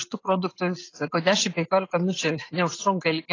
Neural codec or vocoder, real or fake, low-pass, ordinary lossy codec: none; real; 7.2 kHz; AAC, 32 kbps